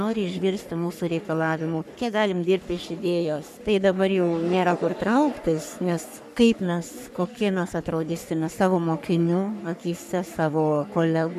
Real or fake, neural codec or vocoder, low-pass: fake; codec, 44.1 kHz, 3.4 kbps, Pupu-Codec; 14.4 kHz